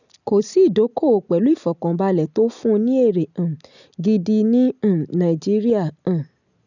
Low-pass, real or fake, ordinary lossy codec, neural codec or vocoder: 7.2 kHz; real; none; none